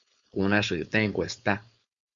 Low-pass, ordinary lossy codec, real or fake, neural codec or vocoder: 7.2 kHz; Opus, 64 kbps; fake; codec, 16 kHz, 4.8 kbps, FACodec